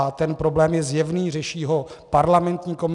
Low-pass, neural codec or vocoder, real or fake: 10.8 kHz; none; real